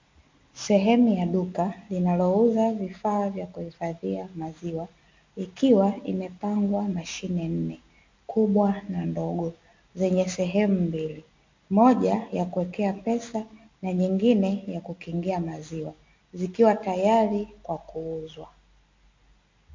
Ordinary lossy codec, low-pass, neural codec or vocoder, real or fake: MP3, 48 kbps; 7.2 kHz; none; real